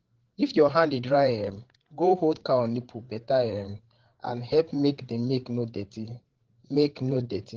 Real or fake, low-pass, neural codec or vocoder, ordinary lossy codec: fake; 7.2 kHz; codec, 16 kHz, 8 kbps, FreqCodec, larger model; Opus, 16 kbps